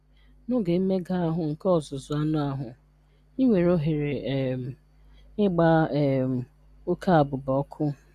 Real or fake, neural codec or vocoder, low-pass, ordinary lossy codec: real; none; 14.4 kHz; Opus, 64 kbps